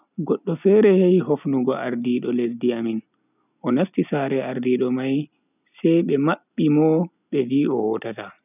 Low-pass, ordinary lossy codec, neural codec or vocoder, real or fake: 3.6 kHz; none; none; real